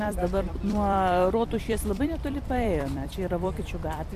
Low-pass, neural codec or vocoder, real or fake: 14.4 kHz; vocoder, 44.1 kHz, 128 mel bands every 256 samples, BigVGAN v2; fake